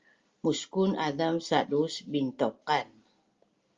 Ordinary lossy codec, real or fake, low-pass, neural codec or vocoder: Opus, 32 kbps; real; 7.2 kHz; none